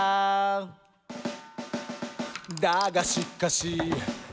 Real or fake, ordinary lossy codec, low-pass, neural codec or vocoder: real; none; none; none